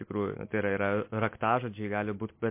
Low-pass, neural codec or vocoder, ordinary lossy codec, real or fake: 3.6 kHz; none; MP3, 24 kbps; real